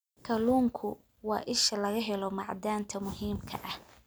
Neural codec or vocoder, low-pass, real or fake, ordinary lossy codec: none; none; real; none